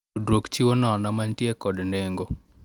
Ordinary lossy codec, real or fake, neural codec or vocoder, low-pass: Opus, 32 kbps; real; none; 19.8 kHz